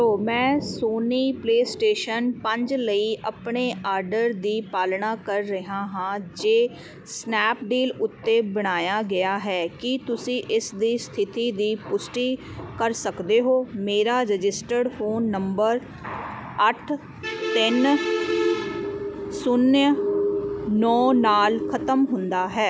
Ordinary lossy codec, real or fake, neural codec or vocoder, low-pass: none; real; none; none